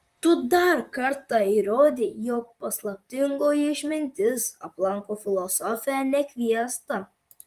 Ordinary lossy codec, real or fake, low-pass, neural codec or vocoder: Opus, 32 kbps; fake; 14.4 kHz; vocoder, 44.1 kHz, 128 mel bands, Pupu-Vocoder